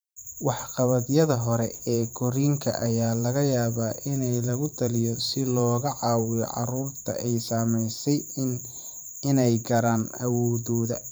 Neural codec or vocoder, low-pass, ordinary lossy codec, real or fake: vocoder, 44.1 kHz, 128 mel bands every 512 samples, BigVGAN v2; none; none; fake